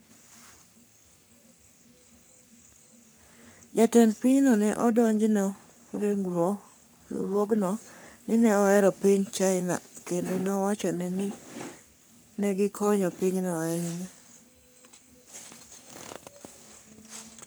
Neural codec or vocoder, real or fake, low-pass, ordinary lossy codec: codec, 44.1 kHz, 3.4 kbps, Pupu-Codec; fake; none; none